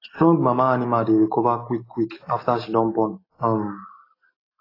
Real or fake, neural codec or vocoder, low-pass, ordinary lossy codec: real; none; 5.4 kHz; AAC, 24 kbps